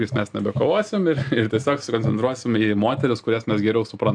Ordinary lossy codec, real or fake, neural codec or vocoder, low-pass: AAC, 64 kbps; real; none; 9.9 kHz